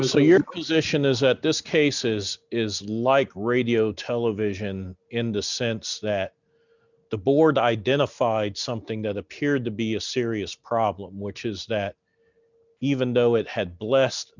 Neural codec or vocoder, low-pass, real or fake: none; 7.2 kHz; real